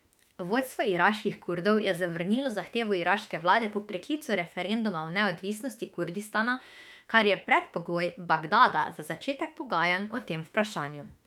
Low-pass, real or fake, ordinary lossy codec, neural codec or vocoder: 19.8 kHz; fake; none; autoencoder, 48 kHz, 32 numbers a frame, DAC-VAE, trained on Japanese speech